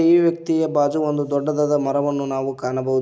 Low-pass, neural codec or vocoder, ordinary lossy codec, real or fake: none; none; none; real